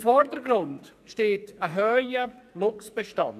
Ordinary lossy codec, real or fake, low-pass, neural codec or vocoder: none; fake; 14.4 kHz; codec, 44.1 kHz, 2.6 kbps, SNAC